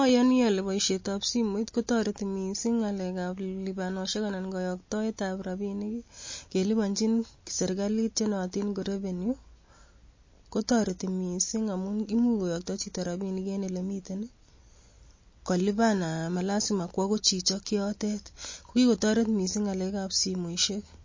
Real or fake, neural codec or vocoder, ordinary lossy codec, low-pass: real; none; MP3, 32 kbps; 7.2 kHz